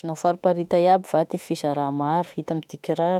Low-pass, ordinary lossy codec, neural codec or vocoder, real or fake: 19.8 kHz; none; autoencoder, 48 kHz, 32 numbers a frame, DAC-VAE, trained on Japanese speech; fake